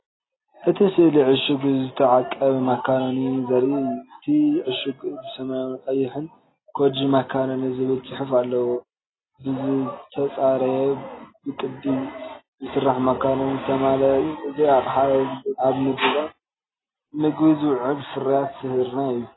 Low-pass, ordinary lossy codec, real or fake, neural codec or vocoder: 7.2 kHz; AAC, 16 kbps; real; none